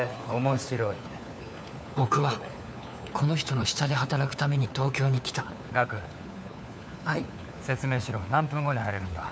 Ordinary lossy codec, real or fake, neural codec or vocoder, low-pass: none; fake; codec, 16 kHz, 4 kbps, FunCodec, trained on LibriTTS, 50 frames a second; none